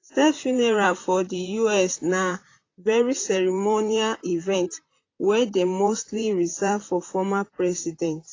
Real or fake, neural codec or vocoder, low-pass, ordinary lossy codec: fake; vocoder, 44.1 kHz, 128 mel bands, Pupu-Vocoder; 7.2 kHz; AAC, 32 kbps